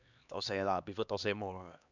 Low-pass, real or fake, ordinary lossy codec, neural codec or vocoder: 7.2 kHz; fake; none; codec, 16 kHz, 2 kbps, X-Codec, HuBERT features, trained on LibriSpeech